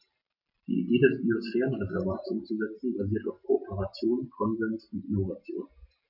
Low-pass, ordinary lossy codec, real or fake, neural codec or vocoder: 5.4 kHz; none; real; none